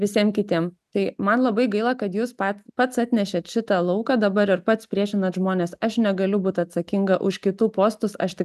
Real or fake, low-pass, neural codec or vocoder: real; 14.4 kHz; none